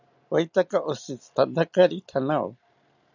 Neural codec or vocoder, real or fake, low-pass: none; real; 7.2 kHz